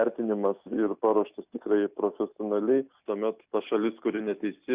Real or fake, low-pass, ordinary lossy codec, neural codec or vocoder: real; 3.6 kHz; Opus, 64 kbps; none